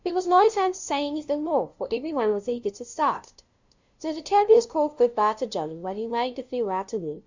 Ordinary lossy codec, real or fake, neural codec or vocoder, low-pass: Opus, 64 kbps; fake; codec, 16 kHz, 0.5 kbps, FunCodec, trained on LibriTTS, 25 frames a second; 7.2 kHz